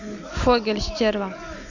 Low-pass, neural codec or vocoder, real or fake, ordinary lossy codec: 7.2 kHz; none; real; none